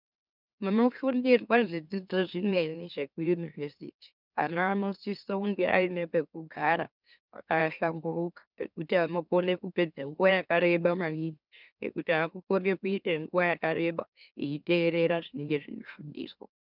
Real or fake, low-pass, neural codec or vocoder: fake; 5.4 kHz; autoencoder, 44.1 kHz, a latent of 192 numbers a frame, MeloTTS